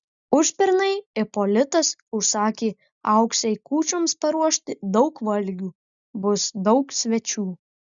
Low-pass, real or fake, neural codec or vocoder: 7.2 kHz; real; none